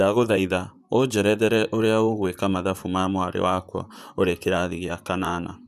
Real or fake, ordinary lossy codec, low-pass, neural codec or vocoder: fake; none; 14.4 kHz; vocoder, 44.1 kHz, 128 mel bands, Pupu-Vocoder